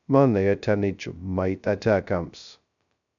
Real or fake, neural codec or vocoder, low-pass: fake; codec, 16 kHz, 0.2 kbps, FocalCodec; 7.2 kHz